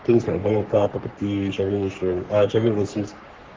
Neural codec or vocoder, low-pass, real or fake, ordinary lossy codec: codec, 44.1 kHz, 3.4 kbps, Pupu-Codec; 7.2 kHz; fake; Opus, 16 kbps